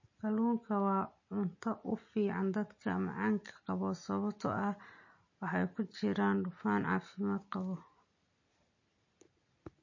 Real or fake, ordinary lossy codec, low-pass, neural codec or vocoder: real; MP3, 32 kbps; 7.2 kHz; none